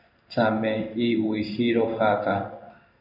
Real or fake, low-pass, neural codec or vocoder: fake; 5.4 kHz; codec, 16 kHz in and 24 kHz out, 1 kbps, XY-Tokenizer